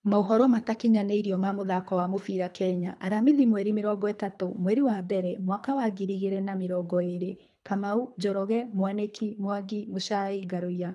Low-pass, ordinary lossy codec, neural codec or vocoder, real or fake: none; none; codec, 24 kHz, 3 kbps, HILCodec; fake